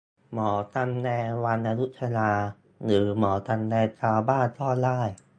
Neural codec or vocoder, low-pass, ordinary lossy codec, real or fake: none; 9.9 kHz; AAC, 64 kbps; real